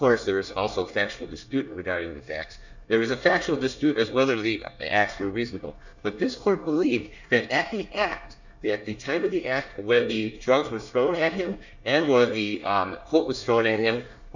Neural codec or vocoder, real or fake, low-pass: codec, 24 kHz, 1 kbps, SNAC; fake; 7.2 kHz